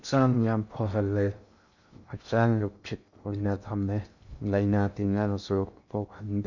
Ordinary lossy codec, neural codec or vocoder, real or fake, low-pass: none; codec, 16 kHz in and 24 kHz out, 0.6 kbps, FocalCodec, streaming, 2048 codes; fake; 7.2 kHz